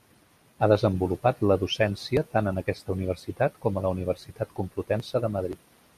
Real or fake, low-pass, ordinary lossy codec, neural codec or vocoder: real; 14.4 kHz; MP3, 96 kbps; none